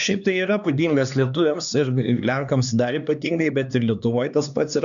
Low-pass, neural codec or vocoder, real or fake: 7.2 kHz; codec, 16 kHz, 2 kbps, X-Codec, HuBERT features, trained on LibriSpeech; fake